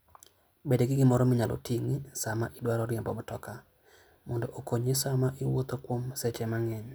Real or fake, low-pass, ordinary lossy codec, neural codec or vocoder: real; none; none; none